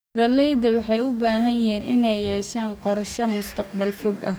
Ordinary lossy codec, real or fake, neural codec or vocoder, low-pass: none; fake; codec, 44.1 kHz, 2.6 kbps, DAC; none